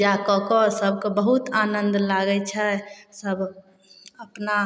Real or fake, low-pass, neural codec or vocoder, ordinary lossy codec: real; none; none; none